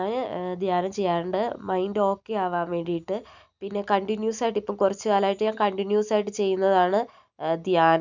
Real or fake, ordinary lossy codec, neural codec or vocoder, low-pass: real; none; none; 7.2 kHz